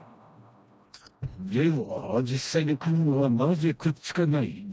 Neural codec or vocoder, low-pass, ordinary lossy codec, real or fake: codec, 16 kHz, 1 kbps, FreqCodec, smaller model; none; none; fake